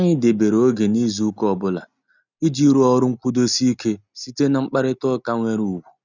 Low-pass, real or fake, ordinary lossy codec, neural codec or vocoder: 7.2 kHz; real; none; none